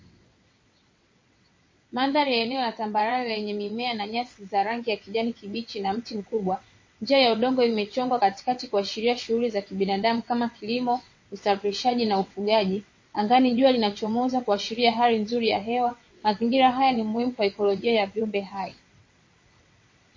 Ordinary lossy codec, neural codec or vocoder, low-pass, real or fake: MP3, 32 kbps; vocoder, 22.05 kHz, 80 mel bands, WaveNeXt; 7.2 kHz; fake